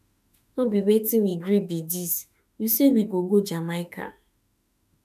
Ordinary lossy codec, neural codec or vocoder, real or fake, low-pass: none; autoencoder, 48 kHz, 32 numbers a frame, DAC-VAE, trained on Japanese speech; fake; 14.4 kHz